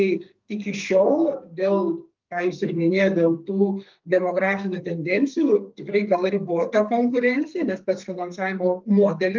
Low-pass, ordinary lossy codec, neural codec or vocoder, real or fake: 7.2 kHz; Opus, 24 kbps; codec, 32 kHz, 1.9 kbps, SNAC; fake